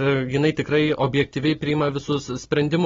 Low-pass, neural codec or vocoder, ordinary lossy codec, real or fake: 7.2 kHz; none; AAC, 24 kbps; real